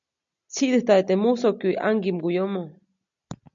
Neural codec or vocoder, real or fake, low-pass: none; real; 7.2 kHz